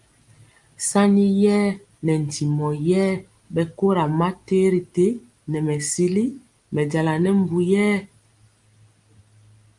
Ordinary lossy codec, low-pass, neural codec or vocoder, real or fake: Opus, 32 kbps; 10.8 kHz; none; real